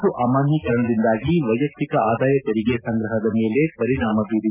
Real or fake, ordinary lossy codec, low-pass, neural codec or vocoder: real; none; 3.6 kHz; none